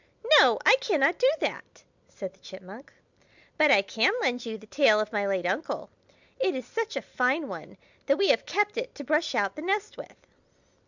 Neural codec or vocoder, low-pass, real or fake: none; 7.2 kHz; real